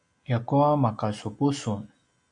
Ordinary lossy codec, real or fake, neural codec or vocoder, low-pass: AAC, 64 kbps; real; none; 9.9 kHz